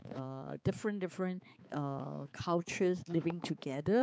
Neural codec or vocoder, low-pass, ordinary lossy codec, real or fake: codec, 16 kHz, 4 kbps, X-Codec, HuBERT features, trained on balanced general audio; none; none; fake